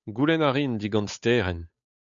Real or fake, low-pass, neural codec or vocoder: fake; 7.2 kHz; codec, 16 kHz, 8 kbps, FunCodec, trained on Chinese and English, 25 frames a second